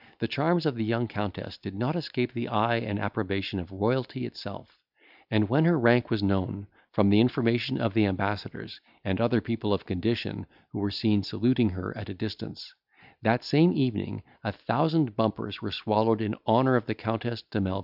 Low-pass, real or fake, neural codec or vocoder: 5.4 kHz; real; none